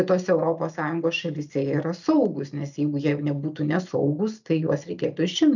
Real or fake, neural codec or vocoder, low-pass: real; none; 7.2 kHz